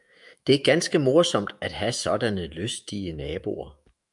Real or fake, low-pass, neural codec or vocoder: fake; 10.8 kHz; autoencoder, 48 kHz, 128 numbers a frame, DAC-VAE, trained on Japanese speech